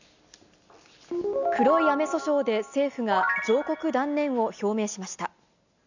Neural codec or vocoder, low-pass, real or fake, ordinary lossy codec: none; 7.2 kHz; real; none